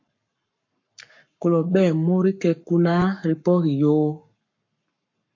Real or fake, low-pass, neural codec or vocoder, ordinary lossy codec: fake; 7.2 kHz; codec, 44.1 kHz, 7.8 kbps, Pupu-Codec; MP3, 48 kbps